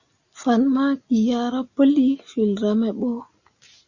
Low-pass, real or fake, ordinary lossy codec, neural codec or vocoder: 7.2 kHz; real; Opus, 64 kbps; none